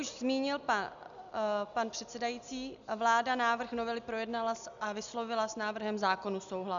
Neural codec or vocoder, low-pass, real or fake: none; 7.2 kHz; real